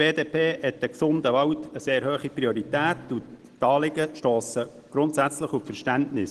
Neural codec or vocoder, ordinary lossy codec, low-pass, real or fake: none; Opus, 16 kbps; 10.8 kHz; real